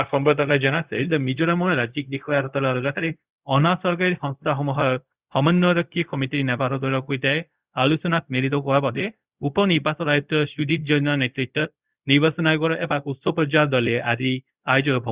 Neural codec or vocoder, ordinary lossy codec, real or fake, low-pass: codec, 16 kHz, 0.4 kbps, LongCat-Audio-Codec; Opus, 64 kbps; fake; 3.6 kHz